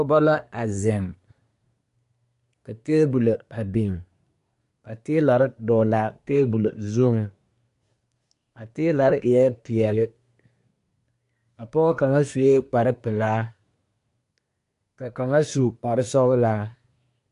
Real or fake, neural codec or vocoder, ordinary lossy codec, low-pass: fake; codec, 24 kHz, 1 kbps, SNAC; AAC, 64 kbps; 10.8 kHz